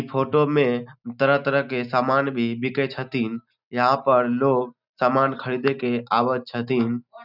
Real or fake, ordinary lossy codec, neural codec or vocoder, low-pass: real; none; none; 5.4 kHz